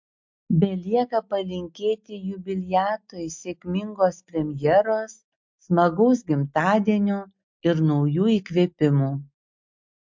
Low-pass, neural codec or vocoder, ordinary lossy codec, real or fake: 7.2 kHz; none; MP3, 48 kbps; real